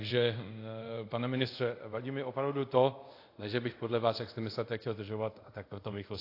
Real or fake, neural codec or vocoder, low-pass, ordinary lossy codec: fake; codec, 24 kHz, 0.5 kbps, DualCodec; 5.4 kHz; AAC, 32 kbps